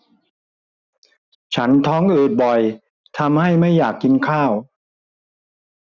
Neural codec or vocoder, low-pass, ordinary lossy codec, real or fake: none; 7.2 kHz; none; real